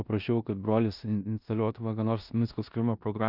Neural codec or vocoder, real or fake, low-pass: codec, 16 kHz in and 24 kHz out, 0.9 kbps, LongCat-Audio-Codec, four codebook decoder; fake; 5.4 kHz